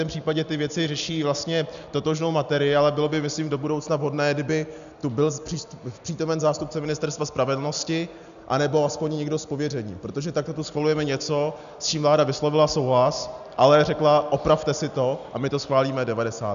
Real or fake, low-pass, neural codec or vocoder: real; 7.2 kHz; none